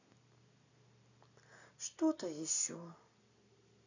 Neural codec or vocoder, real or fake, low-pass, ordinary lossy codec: none; real; 7.2 kHz; none